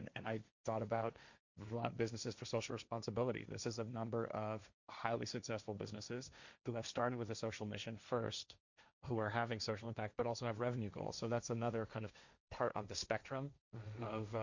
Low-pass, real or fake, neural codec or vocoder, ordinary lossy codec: 7.2 kHz; fake; codec, 16 kHz, 1.1 kbps, Voila-Tokenizer; Opus, 64 kbps